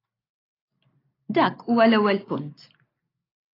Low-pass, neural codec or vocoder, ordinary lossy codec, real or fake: 5.4 kHz; none; AAC, 24 kbps; real